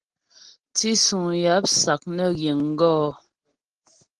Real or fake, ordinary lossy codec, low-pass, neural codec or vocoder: real; Opus, 16 kbps; 9.9 kHz; none